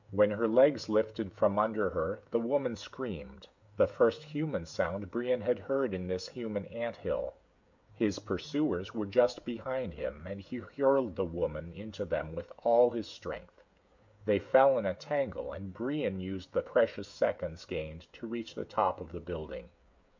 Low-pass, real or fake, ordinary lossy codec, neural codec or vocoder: 7.2 kHz; fake; MP3, 64 kbps; codec, 16 kHz, 16 kbps, FreqCodec, smaller model